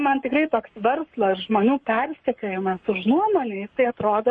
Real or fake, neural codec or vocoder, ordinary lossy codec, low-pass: fake; codec, 44.1 kHz, 7.8 kbps, Pupu-Codec; MP3, 48 kbps; 9.9 kHz